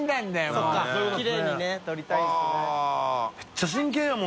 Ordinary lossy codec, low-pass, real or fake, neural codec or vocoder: none; none; real; none